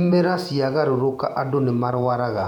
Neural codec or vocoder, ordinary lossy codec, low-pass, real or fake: vocoder, 48 kHz, 128 mel bands, Vocos; none; 19.8 kHz; fake